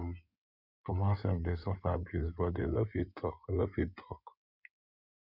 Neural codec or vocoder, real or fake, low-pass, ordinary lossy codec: codec, 16 kHz in and 24 kHz out, 2.2 kbps, FireRedTTS-2 codec; fake; 5.4 kHz; none